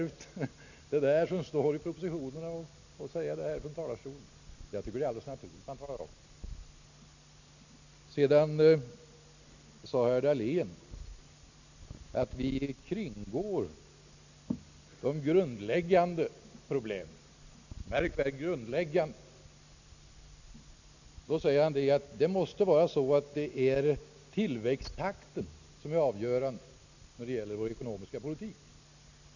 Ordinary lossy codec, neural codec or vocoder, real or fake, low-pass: none; none; real; 7.2 kHz